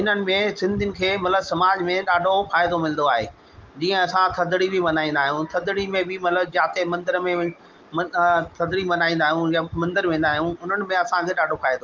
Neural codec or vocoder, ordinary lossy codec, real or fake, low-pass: none; Opus, 32 kbps; real; 7.2 kHz